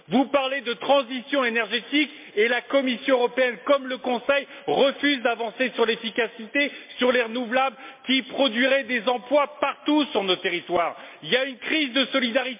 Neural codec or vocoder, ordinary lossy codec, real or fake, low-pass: none; MP3, 32 kbps; real; 3.6 kHz